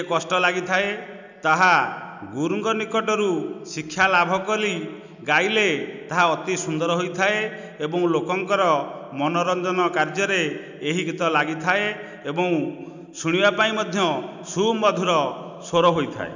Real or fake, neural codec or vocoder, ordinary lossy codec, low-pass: real; none; none; 7.2 kHz